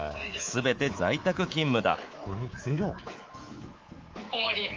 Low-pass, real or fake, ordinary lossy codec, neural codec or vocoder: 7.2 kHz; fake; Opus, 32 kbps; codec, 24 kHz, 3.1 kbps, DualCodec